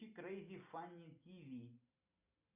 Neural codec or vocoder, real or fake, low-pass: none; real; 3.6 kHz